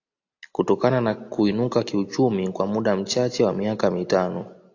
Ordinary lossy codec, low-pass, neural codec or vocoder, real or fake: AAC, 48 kbps; 7.2 kHz; none; real